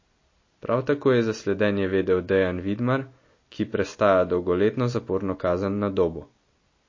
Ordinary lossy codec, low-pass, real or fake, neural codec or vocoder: MP3, 32 kbps; 7.2 kHz; real; none